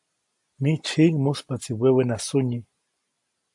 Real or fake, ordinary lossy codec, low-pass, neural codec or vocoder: real; AAC, 64 kbps; 10.8 kHz; none